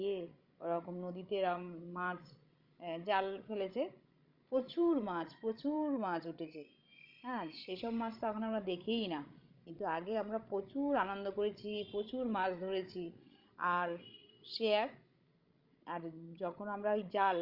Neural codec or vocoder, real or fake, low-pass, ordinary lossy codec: codec, 16 kHz, 16 kbps, FreqCodec, larger model; fake; 5.4 kHz; Opus, 64 kbps